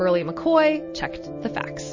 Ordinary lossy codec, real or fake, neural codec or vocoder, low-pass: MP3, 32 kbps; real; none; 7.2 kHz